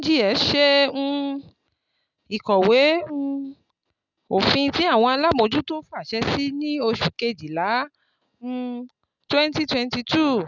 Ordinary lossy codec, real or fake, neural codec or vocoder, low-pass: none; real; none; 7.2 kHz